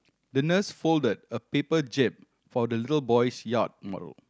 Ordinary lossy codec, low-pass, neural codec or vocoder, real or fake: none; none; none; real